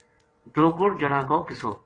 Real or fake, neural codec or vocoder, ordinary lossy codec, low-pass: fake; vocoder, 22.05 kHz, 80 mel bands, WaveNeXt; AAC, 32 kbps; 9.9 kHz